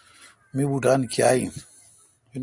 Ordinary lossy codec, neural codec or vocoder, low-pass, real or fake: Opus, 64 kbps; none; 10.8 kHz; real